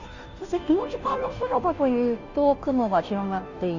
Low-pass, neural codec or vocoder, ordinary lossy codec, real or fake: 7.2 kHz; codec, 16 kHz, 0.5 kbps, FunCodec, trained on Chinese and English, 25 frames a second; Opus, 64 kbps; fake